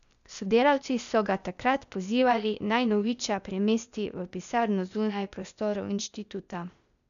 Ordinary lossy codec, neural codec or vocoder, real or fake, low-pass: none; codec, 16 kHz, 0.8 kbps, ZipCodec; fake; 7.2 kHz